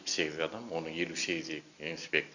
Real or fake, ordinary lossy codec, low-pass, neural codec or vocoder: real; none; 7.2 kHz; none